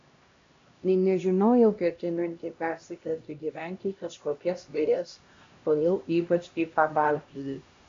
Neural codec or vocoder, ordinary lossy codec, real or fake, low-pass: codec, 16 kHz, 1 kbps, X-Codec, HuBERT features, trained on LibriSpeech; AAC, 48 kbps; fake; 7.2 kHz